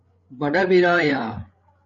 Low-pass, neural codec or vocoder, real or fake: 7.2 kHz; codec, 16 kHz, 8 kbps, FreqCodec, larger model; fake